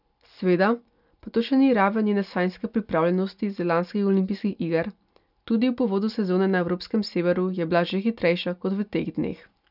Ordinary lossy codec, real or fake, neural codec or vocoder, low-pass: none; real; none; 5.4 kHz